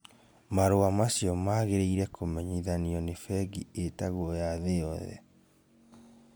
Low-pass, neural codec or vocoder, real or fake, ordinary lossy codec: none; none; real; none